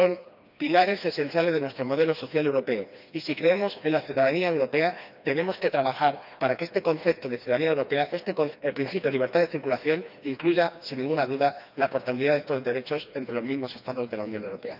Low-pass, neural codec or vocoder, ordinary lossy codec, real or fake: 5.4 kHz; codec, 16 kHz, 2 kbps, FreqCodec, smaller model; none; fake